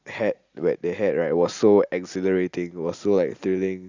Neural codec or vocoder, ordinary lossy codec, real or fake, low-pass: none; Opus, 64 kbps; real; 7.2 kHz